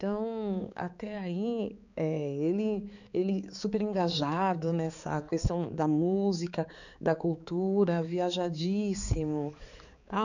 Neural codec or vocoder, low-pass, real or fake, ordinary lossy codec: codec, 16 kHz, 4 kbps, X-Codec, HuBERT features, trained on balanced general audio; 7.2 kHz; fake; none